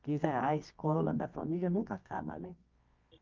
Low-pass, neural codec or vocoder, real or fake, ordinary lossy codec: 7.2 kHz; codec, 24 kHz, 0.9 kbps, WavTokenizer, medium music audio release; fake; Opus, 24 kbps